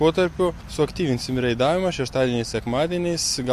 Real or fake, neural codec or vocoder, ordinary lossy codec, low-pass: real; none; MP3, 64 kbps; 14.4 kHz